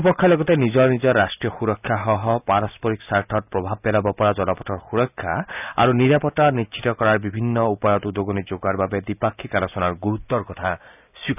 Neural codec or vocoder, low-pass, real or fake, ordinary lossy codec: none; 3.6 kHz; real; none